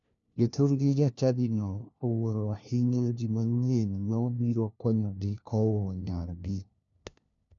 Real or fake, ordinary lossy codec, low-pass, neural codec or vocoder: fake; none; 7.2 kHz; codec, 16 kHz, 1 kbps, FunCodec, trained on LibriTTS, 50 frames a second